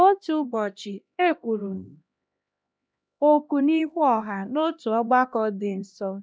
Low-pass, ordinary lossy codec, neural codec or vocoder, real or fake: none; none; codec, 16 kHz, 1 kbps, X-Codec, HuBERT features, trained on LibriSpeech; fake